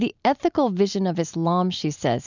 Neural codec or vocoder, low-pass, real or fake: none; 7.2 kHz; real